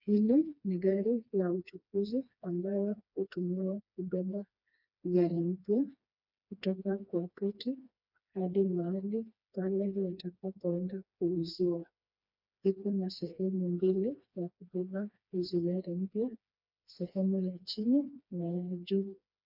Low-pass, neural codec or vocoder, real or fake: 5.4 kHz; codec, 16 kHz, 2 kbps, FreqCodec, smaller model; fake